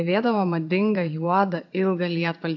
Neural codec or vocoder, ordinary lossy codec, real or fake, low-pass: vocoder, 22.05 kHz, 80 mel bands, Vocos; AAC, 48 kbps; fake; 7.2 kHz